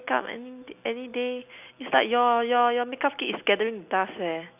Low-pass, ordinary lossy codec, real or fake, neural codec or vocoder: 3.6 kHz; none; real; none